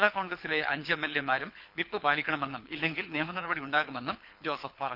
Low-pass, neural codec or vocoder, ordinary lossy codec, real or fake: 5.4 kHz; codec, 24 kHz, 6 kbps, HILCodec; none; fake